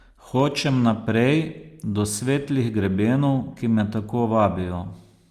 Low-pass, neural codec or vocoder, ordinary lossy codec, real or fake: 14.4 kHz; none; Opus, 32 kbps; real